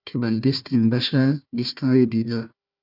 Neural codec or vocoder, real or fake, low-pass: codec, 16 kHz, 1 kbps, FunCodec, trained on Chinese and English, 50 frames a second; fake; 5.4 kHz